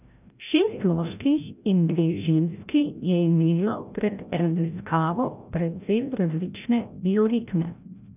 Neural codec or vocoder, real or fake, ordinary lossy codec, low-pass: codec, 16 kHz, 0.5 kbps, FreqCodec, larger model; fake; none; 3.6 kHz